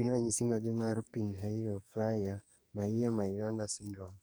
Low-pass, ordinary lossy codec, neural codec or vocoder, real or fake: none; none; codec, 44.1 kHz, 2.6 kbps, SNAC; fake